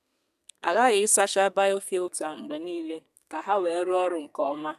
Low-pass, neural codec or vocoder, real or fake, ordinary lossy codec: 14.4 kHz; codec, 32 kHz, 1.9 kbps, SNAC; fake; none